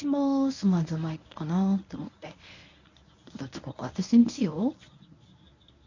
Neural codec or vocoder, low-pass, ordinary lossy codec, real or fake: codec, 24 kHz, 0.9 kbps, WavTokenizer, medium speech release version 1; 7.2 kHz; none; fake